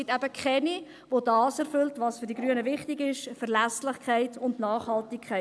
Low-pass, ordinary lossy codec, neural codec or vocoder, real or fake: none; none; none; real